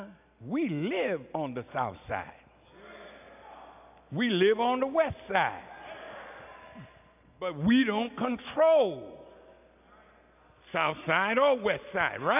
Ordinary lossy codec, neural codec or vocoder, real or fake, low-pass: AAC, 32 kbps; none; real; 3.6 kHz